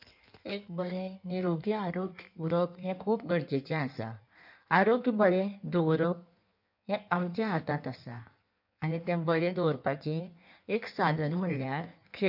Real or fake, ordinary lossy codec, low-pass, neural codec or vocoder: fake; none; 5.4 kHz; codec, 16 kHz in and 24 kHz out, 1.1 kbps, FireRedTTS-2 codec